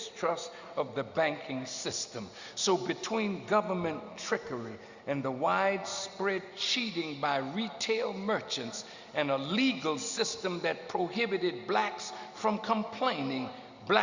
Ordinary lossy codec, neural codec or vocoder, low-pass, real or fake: Opus, 64 kbps; none; 7.2 kHz; real